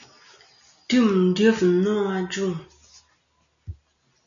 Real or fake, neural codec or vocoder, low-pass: real; none; 7.2 kHz